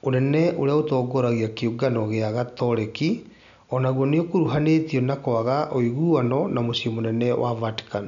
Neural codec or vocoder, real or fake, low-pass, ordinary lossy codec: none; real; 7.2 kHz; none